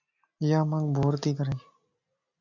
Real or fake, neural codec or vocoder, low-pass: real; none; 7.2 kHz